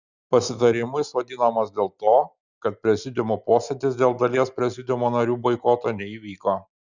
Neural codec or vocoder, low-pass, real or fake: none; 7.2 kHz; real